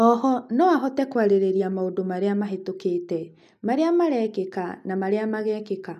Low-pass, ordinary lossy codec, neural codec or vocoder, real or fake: 14.4 kHz; none; none; real